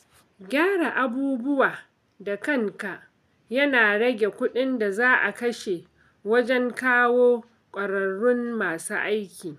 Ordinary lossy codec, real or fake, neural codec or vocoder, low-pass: none; real; none; 14.4 kHz